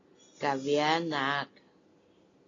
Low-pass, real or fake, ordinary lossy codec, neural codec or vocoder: 7.2 kHz; real; AAC, 32 kbps; none